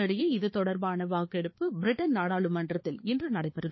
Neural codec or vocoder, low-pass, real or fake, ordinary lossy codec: codec, 16 kHz, 4 kbps, X-Codec, HuBERT features, trained on balanced general audio; 7.2 kHz; fake; MP3, 24 kbps